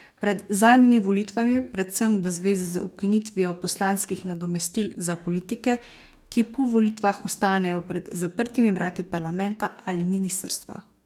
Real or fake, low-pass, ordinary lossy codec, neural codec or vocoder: fake; 19.8 kHz; none; codec, 44.1 kHz, 2.6 kbps, DAC